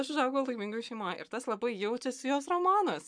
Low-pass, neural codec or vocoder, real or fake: 9.9 kHz; codec, 44.1 kHz, 7.8 kbps, Pupu-Codec; fake